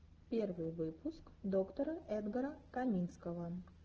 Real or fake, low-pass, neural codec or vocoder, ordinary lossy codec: real; 7.2 kHz; none; Opus, 16 kbps